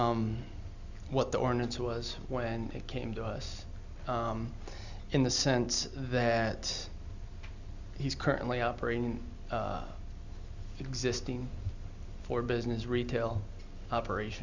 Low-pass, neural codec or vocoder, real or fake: 7.2 kHz; none; real